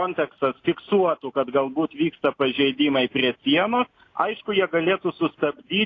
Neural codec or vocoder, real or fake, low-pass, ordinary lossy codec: none; real; 7.2 kHz; AAC, 32 kbps